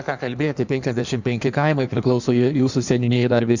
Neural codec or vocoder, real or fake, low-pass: codec, 16 kHz in and 24 kHz out, 1.1 kbps, FireRedTTS-2 codec; fake; 7.2 kHz